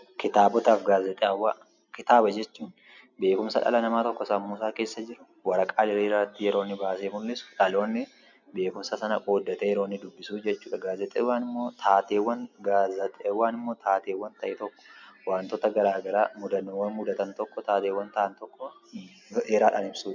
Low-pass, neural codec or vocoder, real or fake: 7.2 kHz; none; real